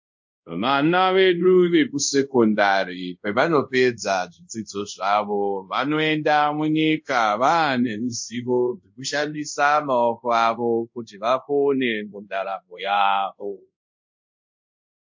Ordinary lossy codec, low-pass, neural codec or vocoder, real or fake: MP3, 48 kbps; 7.2 kHz; codec, 24 kHz, 0.5 kbps, DualCodec; fake